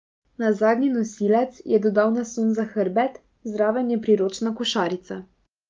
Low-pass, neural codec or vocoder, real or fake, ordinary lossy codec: 7.2 kHz; none; real; Opus, 32 kbps